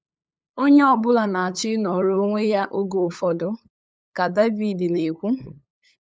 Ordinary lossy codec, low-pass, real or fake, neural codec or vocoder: none; none; fake; codec, 16 kHz, 8 kbps, FunCodec, trained on LibriTTS, 25 frames a second